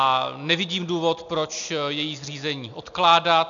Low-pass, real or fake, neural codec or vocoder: 7.2 kHz; real; none